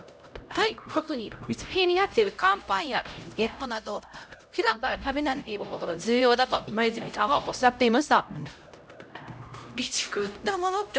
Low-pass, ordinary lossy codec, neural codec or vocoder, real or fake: none; none; codec, 16 kHz, 0.5 kbps, X-Codec, HuBERT features, trained on LibriSpeech; fake